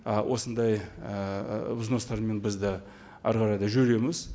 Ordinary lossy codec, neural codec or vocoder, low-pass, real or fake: none; none; none; real